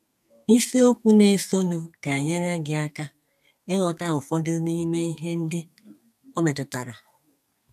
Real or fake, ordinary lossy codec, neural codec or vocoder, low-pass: fake; none; codec, 32 kHz, 1.9 kbps, SNAC; 14.4 kHz